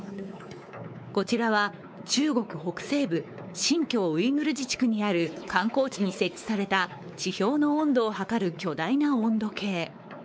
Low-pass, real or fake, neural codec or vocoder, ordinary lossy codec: none; fake; codec, 16 kHz, 4 kbps, X-Codec, WavLM features, trained on Multilingual LibriSpeech; none